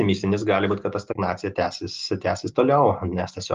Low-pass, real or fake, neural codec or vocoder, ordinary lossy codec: 7.2 kHz; real; none; Opus, 24 kbps